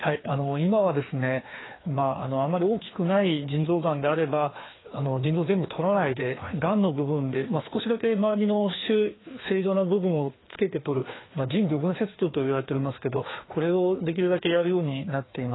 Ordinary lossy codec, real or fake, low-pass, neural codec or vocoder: AAC, 16 kbps; fake; 7.2 kHz; codec, 16 kHz, 2 kbps, FreqCodec, larger model